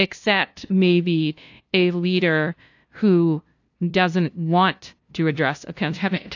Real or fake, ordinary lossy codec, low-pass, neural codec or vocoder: fake; AAC, 48 kbps; 7.2 kHz; codec, 16 kHz, 0.5 kbps, FunCodec, trained on LibriTTS, 25 frames a second